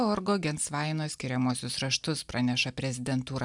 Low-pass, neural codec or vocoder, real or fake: 10.8 kHz; none; real